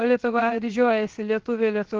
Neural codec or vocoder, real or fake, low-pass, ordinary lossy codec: codec, 16 kHz, 0.7 kbps, FocalCodec; fake; 7.2 kHz; Opus, 16 kbps